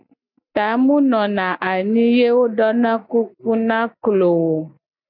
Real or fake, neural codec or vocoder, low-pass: real; none; 5.4 kHz